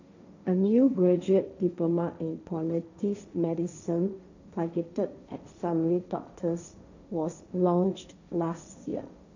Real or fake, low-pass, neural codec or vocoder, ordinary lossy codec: fake; 7.2 kHz; codec, 16 kHz, 1.1 kbps, Voila-Tokenizer; none